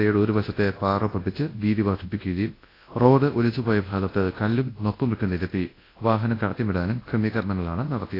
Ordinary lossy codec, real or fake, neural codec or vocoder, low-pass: AAC, 24 kbps; fake; codec, 24 kHz, 0.9 kbps, WavTokenizer, large speech release; 5.4 kHz